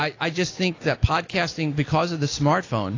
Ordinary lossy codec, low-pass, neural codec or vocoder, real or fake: AAC, 32 kbps; 7.2 kHz; none; real